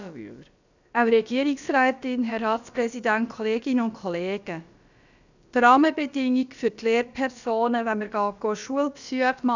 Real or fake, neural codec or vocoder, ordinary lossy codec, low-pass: fake; codec, 16 kHz, about 1 kbps, DyCAST, with the encoder's durations; none; 7.2 kHz